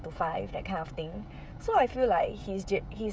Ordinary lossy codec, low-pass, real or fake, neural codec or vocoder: none; none; fake; codec, 16 kHz, 16 kbps, FreqCodec, smaller model